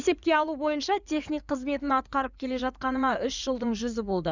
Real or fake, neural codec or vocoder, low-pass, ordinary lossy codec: fake; codec, 16 kHz in and 24 kHz out, 2.2 kbps, FireRedTTS-2 codec; 7.2 kHz; none